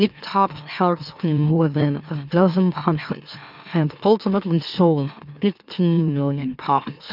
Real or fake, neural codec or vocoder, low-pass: fake; autoencoder, 44.1 kHz, a latent of 192 numbers a frame, MeloTTS; 5.4 kHz